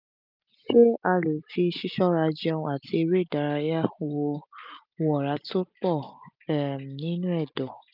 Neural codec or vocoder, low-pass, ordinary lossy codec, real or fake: none; 5.4 kHz; none; real